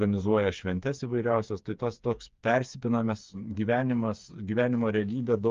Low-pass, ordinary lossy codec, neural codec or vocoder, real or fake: 7.2 kHz; Opus, 32 kbps; codec, 16 kHz, 4 kbps, FreqCodec, smaller model; fake